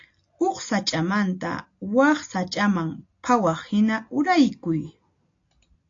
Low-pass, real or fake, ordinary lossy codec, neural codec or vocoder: 7.2 kHz; real; AAC, 48 kbps; none